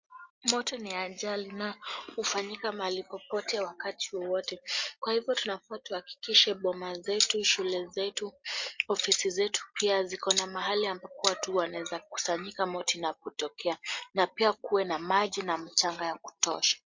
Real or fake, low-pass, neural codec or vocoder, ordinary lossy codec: real; 7.2 kHz; none; MP3, 48 kbps